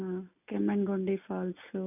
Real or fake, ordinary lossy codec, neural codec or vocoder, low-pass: real; none; none; 3.6 kHz